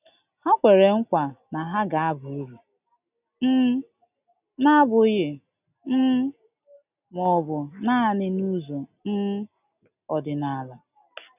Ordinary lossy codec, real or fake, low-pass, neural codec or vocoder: none; real; 3.6 kHz; none